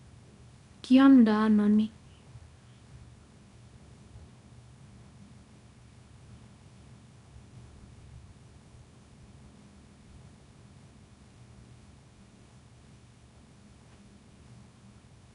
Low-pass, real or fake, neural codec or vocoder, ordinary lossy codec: 10.8 kHz; fake; codec, 24 kHz, 0.9 kbps, WavTokenizer, small release; none